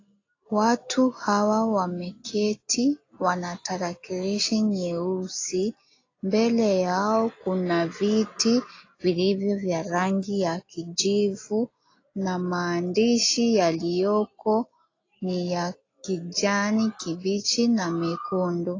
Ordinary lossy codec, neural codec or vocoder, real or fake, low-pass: AAC, 32 kbps; none; real; 7.2 kHz